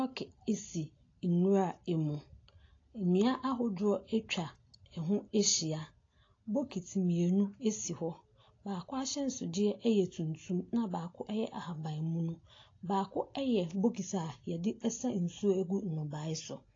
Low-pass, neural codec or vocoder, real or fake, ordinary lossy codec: 7.2 kHz; none; real; AAC, 32 kbps